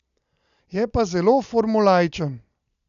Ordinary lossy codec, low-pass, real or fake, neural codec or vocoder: none; 7.2 kHz; real; none